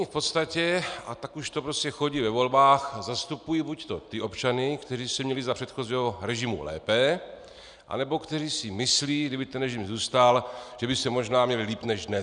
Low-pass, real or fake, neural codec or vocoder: 9.9 kHz; real; none